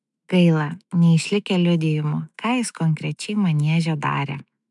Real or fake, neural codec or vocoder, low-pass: fake; autoencoder, 48 kHz, 128 numbers a frame, DAC-VAE, trained on Japanese speech; 10.8 kHz